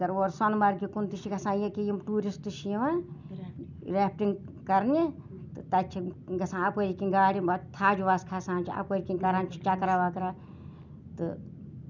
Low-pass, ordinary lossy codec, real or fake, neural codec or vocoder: 7.2 kHz; none; real; none